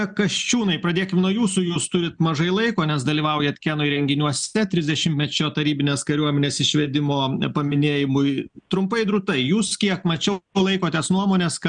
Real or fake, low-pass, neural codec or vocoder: real; 9.9 kHz; none